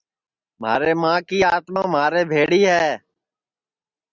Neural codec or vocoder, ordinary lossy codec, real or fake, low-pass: none; Opus, 64 kbps; real; 7.2 kHz